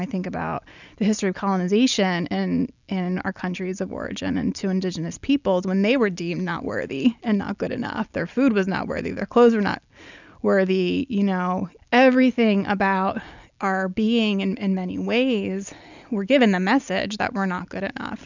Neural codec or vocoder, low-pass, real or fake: none; 7.2 kHz; real